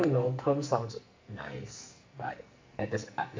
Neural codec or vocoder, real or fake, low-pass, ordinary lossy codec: codec, 44.1 kHz, 2.6 kbps, SNAC; fake; 7.2 kHz; MP3, 64 kbps